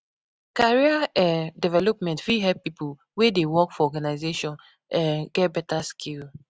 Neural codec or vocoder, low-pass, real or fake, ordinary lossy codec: none; none; real; none